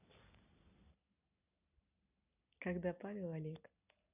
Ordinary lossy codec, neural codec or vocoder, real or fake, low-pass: none; none; real; 3.6 kHz